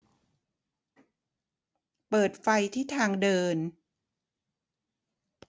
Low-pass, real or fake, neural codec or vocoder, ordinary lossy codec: none; real; none; none